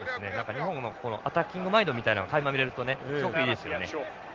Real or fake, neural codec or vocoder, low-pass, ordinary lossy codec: real; none; 7.2 kHz; Opus, 16 kbps